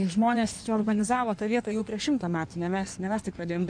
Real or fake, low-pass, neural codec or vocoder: fake; 9.9 kHz; codec, 16 kHz in and 24 kHz out, 1.1 kbps, FireRedTTS-2 codec